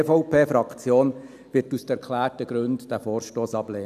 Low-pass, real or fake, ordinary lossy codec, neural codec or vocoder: 14.4 kHz; real; none; none